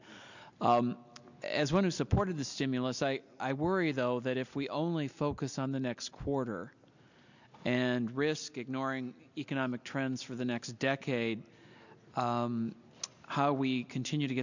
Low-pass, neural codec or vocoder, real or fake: 7.2 kHz; none; real